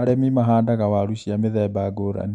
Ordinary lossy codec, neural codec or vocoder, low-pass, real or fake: none; none; 9.9 kHz; real